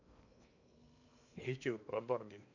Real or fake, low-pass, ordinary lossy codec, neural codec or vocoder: fake; 7.2 kHz; none; codec, 16 kHz in and 24 kHz out, 0.8 kbps, FocalCodec, streaming, 65536 codes